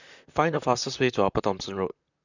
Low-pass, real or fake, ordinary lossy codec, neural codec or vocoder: 7.2 kHz; fake; none; vocoder, 44.1 kHz, 128 mel bands, Pupu-Vocoder